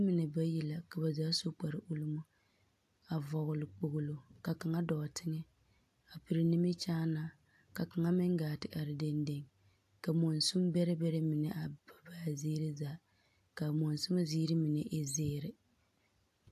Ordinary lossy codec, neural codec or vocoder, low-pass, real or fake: AAC, 64 kbps; none; 14.4 kHz; real